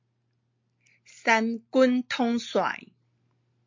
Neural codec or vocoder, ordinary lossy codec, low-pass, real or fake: none; MP3, 48 kbps; 7.2 kHz; real